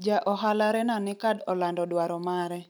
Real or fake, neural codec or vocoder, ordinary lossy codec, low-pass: real; none; none; none